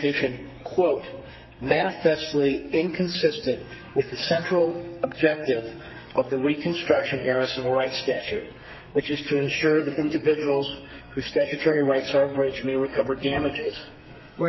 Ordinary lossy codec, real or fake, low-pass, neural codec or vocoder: MP3, 24 kbps; fake; 7.2 kHz; codec, 32 kHz, 1.9 kbps, SNAC